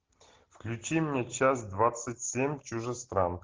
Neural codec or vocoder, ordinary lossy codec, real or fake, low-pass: none; Opus, 16 kbps; real; 7.2 kHz